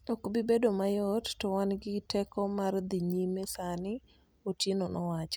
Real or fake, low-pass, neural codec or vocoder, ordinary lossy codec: real; none; none; none